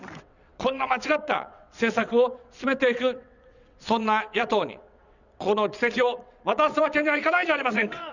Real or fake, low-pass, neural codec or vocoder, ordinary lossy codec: fake; 7.2 kHz; vocoder, 22.05 kHz, 80 mel bands, WaveNeXt; none